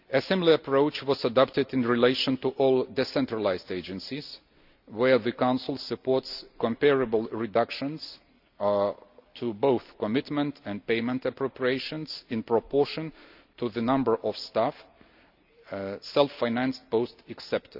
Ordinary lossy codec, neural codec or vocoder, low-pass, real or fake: none; none; 5.4 kHz; real